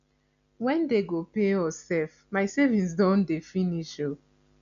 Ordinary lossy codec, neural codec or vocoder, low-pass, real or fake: none; none; 7.2 kHz; real